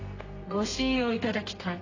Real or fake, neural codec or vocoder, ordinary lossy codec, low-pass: fake; codec, 32 kHz, 1.9 kbps, SNAC; AAC, 32 kbps; 7.2 kHz